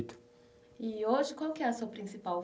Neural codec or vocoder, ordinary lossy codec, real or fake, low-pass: none; none; real; none